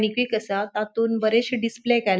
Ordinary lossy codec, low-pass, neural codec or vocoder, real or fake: none; none; none; real